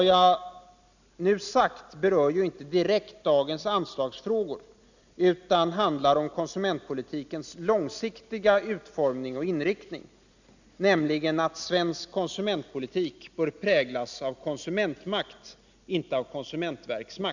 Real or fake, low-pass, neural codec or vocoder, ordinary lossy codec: real; 7.2 kHz; none; none